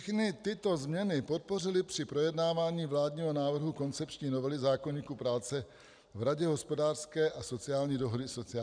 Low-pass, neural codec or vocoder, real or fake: 9.9 kHz; none; real